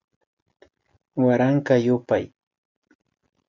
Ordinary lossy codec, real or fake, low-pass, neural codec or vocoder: Opus, 64 kbps; real; 7.2 kHz; none